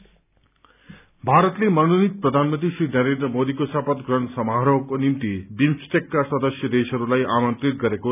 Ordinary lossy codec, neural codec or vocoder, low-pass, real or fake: none; none; 3.6 kHz; real